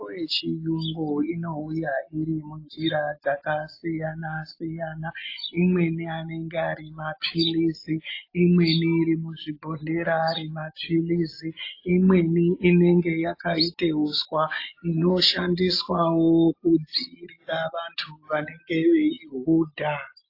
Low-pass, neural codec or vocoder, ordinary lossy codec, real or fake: 5.4 kHz; none; AAC, 32 kbps; real